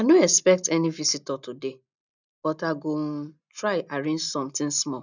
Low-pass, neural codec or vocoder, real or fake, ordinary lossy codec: 7.2 kHz; none; real; none